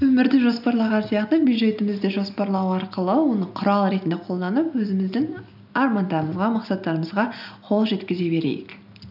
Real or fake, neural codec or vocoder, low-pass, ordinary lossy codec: real; none; 5.4 kHz; none